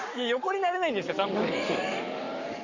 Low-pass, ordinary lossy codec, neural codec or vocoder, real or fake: 7.2 kHz; Opus, 64 kbps; codec, 44.1 kHz, 7.8 kbps, Pupu-Codec; fake